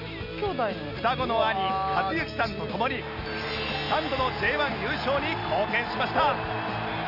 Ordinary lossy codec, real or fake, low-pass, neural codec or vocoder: none; real; 5.4 kHz; none